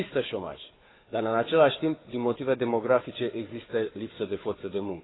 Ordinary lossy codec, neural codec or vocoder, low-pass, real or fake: AAC, 16 kbps; codec, 16 kHz, 4 kbps, FunCodec, trained on Chinese and English, 50 frames a second; 7.2 kHz; fake